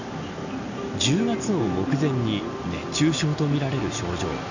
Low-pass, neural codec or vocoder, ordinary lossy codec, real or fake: 7.2 kHz; none; none; real